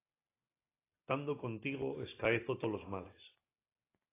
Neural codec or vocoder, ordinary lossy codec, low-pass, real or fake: none; AAC, 16 kbps; 3.6 kHz; real